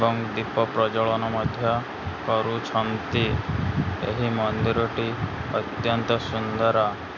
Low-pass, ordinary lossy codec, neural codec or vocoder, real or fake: 7.2 kHz; none; none; real